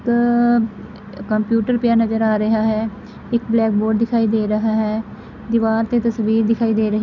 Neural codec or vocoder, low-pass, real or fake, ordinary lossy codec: none; 7.2 kHz; real; none